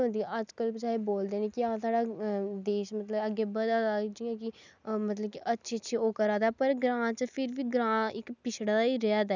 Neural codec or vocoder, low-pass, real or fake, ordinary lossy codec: none; 7.2 kHz; real; none